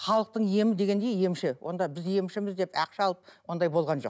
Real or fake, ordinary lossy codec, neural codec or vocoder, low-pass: real; none; none; none